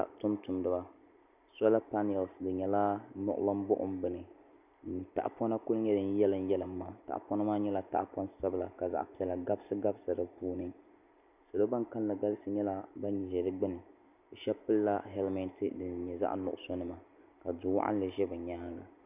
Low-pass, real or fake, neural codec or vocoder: 3.6 kHz; real; none